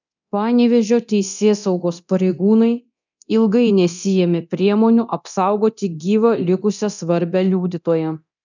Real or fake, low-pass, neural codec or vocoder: fake; 7.2 kHz; codec, 24 kHz, 0.9 kbps, DualCodec